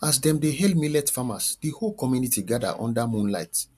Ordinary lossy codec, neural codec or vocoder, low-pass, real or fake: MP3, 96 kbps; none; 14.4 kHz; real